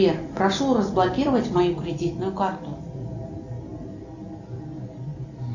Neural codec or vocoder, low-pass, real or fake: none; 7.2 kHz; real